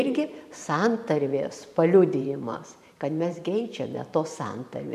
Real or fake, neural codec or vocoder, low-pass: fake; vocoder, 44.1 kHz, 128 mel bands every 512 samples, BigVGAN v2; 14.4 kHz